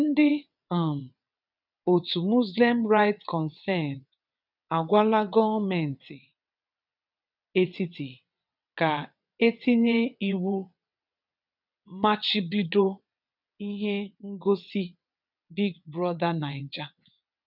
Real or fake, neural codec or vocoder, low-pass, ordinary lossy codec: fake; vocoder, 22.05 kHz, 80 mel bands, WaveNeXt; 5.4 kHz; none